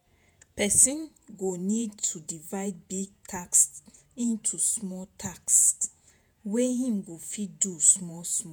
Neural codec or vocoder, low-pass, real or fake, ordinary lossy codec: vocoder, 48 kHz, 128 mel bands, Vocos; none; fake; none